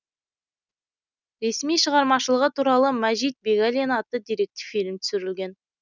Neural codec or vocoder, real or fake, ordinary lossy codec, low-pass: none; real; none; 7.2 kHz